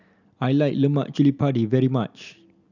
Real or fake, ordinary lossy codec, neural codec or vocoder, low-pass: real; none; none; 7.2 kHz